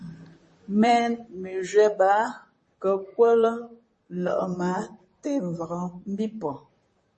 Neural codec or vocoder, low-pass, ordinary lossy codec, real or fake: vocoder, 44.1 kHz, 128 mel bands, Pupu-Vocoder; 10.8 kHz; MP3, 32 kbps; fake